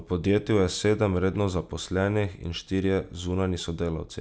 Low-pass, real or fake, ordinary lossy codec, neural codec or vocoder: none; real; none; none